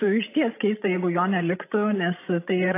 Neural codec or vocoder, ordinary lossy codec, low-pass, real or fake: codec, 16 kHz, 16 kbps, FreqCodec, larger model; AAC, 24 kbps; 3.6 kHz; fake